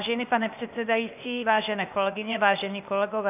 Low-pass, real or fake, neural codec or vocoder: 3.6 kHz; fake; codec, 16 kHz, 0.8 kbps, ZipCodec